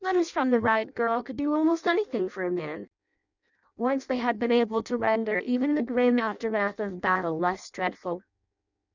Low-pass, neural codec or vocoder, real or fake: 7.2 kHz; codec, 16 kHz in and 24 kHz out, 0.6 kbps, FireRedTTS-2 codec; fake